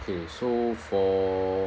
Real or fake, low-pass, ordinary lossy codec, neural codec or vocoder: real; none; none; none